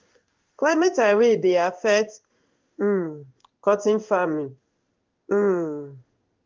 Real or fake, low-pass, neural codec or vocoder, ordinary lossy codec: fake; 7.2 kHz; codec, 16 kHz in and 24 kHz out, 1 kbps, XY-Tokenizer; Opus, 24 kbps